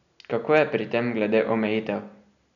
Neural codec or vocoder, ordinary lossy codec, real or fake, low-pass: none; none; real; 7.2 kHz